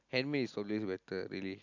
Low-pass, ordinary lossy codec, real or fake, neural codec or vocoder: 7.2 kHz; none; real; none